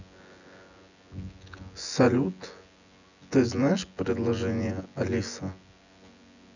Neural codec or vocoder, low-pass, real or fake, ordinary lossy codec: vocoder, 24 kHz, 100 mel bands, Vocos; 7.2 kHz; fake; none